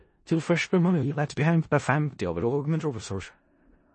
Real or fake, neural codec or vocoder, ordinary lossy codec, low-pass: fake; codec, 16 kHz in and 24 kHz out, 0.4 kbps, LongCat-Audio-Codec, four codebook decoder; MP3, 32 kbps; 10.8 kHz